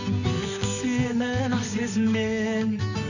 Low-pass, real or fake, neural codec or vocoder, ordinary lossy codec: 7.2 kHz; fake; codec, 24 kHz, 0.9 kbps, WavTokenizer, medium music audio release; none